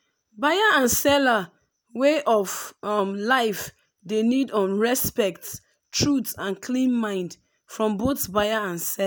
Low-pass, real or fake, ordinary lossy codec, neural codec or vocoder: none; real; none; none